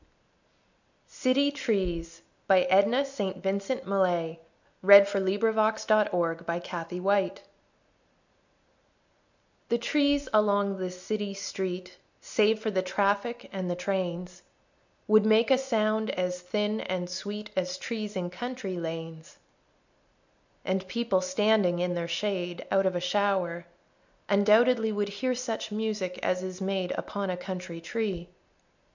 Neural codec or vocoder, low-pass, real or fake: none; 7.2 kHz; real